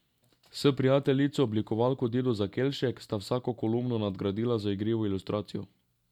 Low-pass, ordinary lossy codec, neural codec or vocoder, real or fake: 19.8 kHz; none; none; real